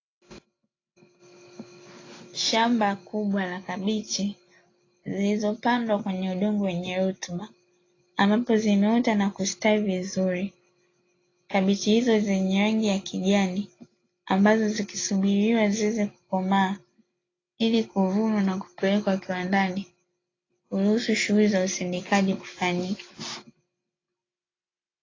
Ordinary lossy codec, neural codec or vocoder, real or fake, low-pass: AAC, 32 kbps; none; real; 7.2 kHz